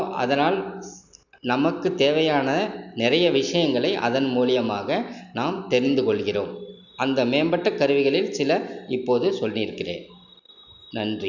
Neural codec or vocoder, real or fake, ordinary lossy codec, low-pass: none; real; none; 7.2 kHz